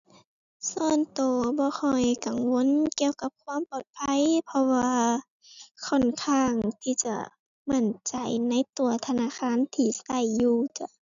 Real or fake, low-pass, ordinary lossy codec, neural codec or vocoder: real; 7.2 kHz; none; none